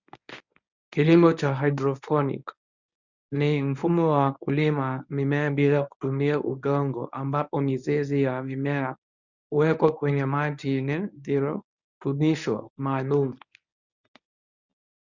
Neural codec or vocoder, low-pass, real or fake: codec, 24 kHz, 0.9 kbps, WavTokenizer, medium speech release version 1; 7.2 kHz; fake